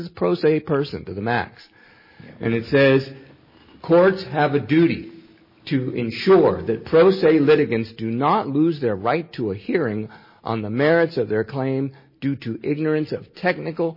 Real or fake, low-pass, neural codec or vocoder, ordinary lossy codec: real; 5.4 kHz; none; MP3, 24 kbps